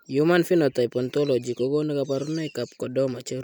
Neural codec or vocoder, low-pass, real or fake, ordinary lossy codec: none; 19.8 kHz; real; MP3, 96 kbps